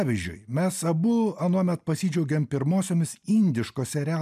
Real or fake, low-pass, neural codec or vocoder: real; 14.4 kHz; none